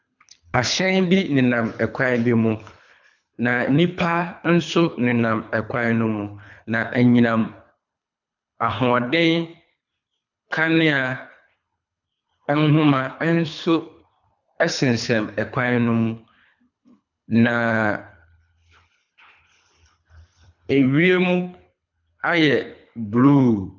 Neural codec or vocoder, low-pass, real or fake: codec, 24 kHz, 3 kbps, HILCodec; 7.2 kHz; fake